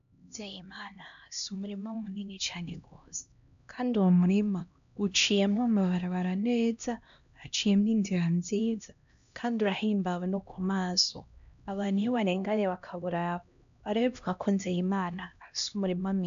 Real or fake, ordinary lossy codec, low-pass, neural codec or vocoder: fake; AAC, 96 kbps; 7.2 kHz; codec, 16 kHz, 1 kbps, X-Codec, HuBERT features, trained on LibriSpeech